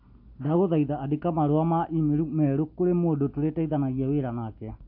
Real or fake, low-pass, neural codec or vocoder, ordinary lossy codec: real; 5.4 kHz; none; AAC, 48 kbps